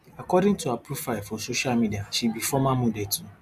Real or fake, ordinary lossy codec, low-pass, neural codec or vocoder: real; none; 14.4 kHz; none